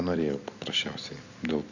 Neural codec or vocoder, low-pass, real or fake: none; 7.2 kHz; real